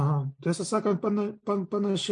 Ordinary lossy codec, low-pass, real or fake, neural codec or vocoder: MP3, 64 kbps; 9.9 kHz; real; none